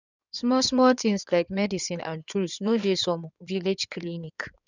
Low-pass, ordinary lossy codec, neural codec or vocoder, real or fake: 7.2 kHz; none; codec, 16 kHz in and 24 kHz out, 2.2 kbps, FireRedTTS-2 codec; fake